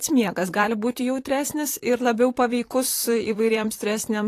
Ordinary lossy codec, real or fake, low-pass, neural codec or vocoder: AAC, 48 kbps; fake; 14.4 kHz; vocoder, 44.1 kHz, 128 mel bands, Pupu-Vocoder